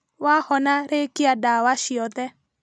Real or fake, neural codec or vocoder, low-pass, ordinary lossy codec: real; none; none; none